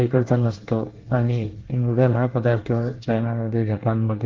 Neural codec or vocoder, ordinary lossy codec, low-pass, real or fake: codec, 24 kHz, 1 kbps, SNAC; Opus, 16 kbps; 7.2 kHz; fake